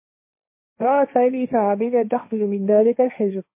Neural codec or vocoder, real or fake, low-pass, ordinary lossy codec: codec, 16 kHz, 1.1 kbps, Voila-Tokenizer; fake; 3.6 kHz; MP3, 24 kbps